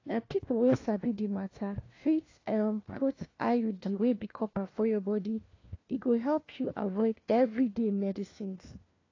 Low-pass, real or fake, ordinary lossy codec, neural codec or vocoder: 7.2 kHz; fake; AAC, 32 kbps; codec, 16 kHz, 1 kbps, FunCodec, trained on LibriTTS, 50 frames a second